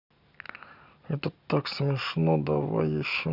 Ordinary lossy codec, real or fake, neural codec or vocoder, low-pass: none; real; none; 5.4 kHz